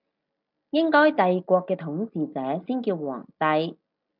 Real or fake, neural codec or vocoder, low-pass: real; none; 5.4 kHz